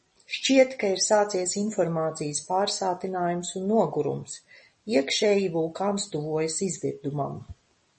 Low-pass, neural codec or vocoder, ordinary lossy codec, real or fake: 10.8 kHz; none; MP3, 32 kbps; real